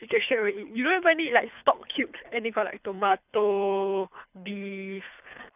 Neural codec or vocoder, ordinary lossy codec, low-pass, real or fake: codec, 24 kHz, 3 kbps, HILCodec; none; 3.6 kHz; fake